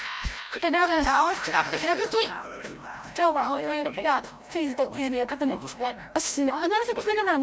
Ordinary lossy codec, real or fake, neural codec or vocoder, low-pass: none; fake; codec, 16 kHz, 0.5 kbps, FreqCodec, larger model; none